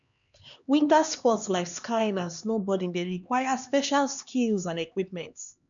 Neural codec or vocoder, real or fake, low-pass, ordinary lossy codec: codec, 16 kHz, 2 kbps, X-Codec, HuBERT features, trained on LibriSpeech; fake; 7.2 kHz; none